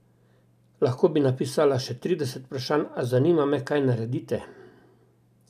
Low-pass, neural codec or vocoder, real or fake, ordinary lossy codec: 14.4 kHz; none; real; none